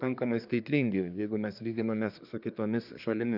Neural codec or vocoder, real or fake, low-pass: codec, 24 kHz, 1 kbps, SNAC; fake; 5.4 kHz